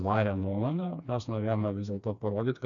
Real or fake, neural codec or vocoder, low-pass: fake; codec, 16 kHz, 2 kbps, FreqCodec, smaller model; 7.2 kHz